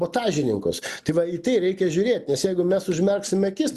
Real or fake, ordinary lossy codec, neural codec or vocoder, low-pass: real; Opus, 64 kbps; none; 14.4 kHz